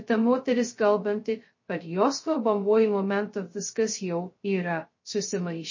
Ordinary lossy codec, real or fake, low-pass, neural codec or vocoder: MP3, 32 kbps; fake; 7.2 kHz; codec, 16 kHz, 0.2 kbps, FocalCodec